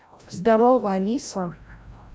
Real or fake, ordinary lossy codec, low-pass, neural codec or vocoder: fake; none; none; codec, 16 kHz, 0.5 kbps, FreqCodec, larger model